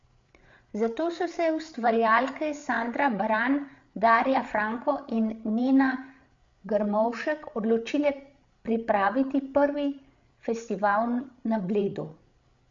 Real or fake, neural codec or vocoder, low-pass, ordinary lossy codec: fake; codec, 16 kHz, 8 kbps, FreqCodec, larger model; 7.2 kHz; MP3, 48 kbps